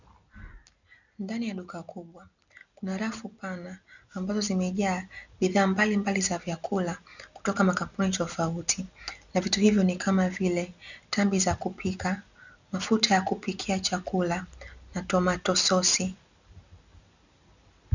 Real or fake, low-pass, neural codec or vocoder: real; 7.2 kHz; none